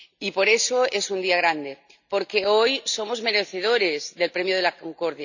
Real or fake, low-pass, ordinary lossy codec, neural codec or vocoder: real; 7.2 kHz; none; none